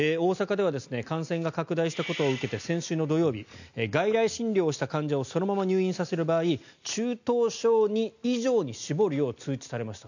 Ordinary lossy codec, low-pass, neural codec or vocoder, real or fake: none; 7.2 kHz; none; real